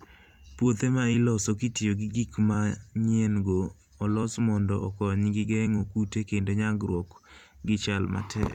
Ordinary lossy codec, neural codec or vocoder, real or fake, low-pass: none; vocoder, 44.1 kHz, 128 mel bands every 256 samples, BigVGAN v2; fake; 19.8 kHz